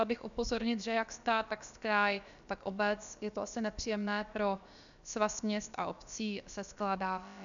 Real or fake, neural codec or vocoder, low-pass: fake; codec, 16 kHz, about 1 kbps, DyCAST, with the encoder's durations; 7.2 kHz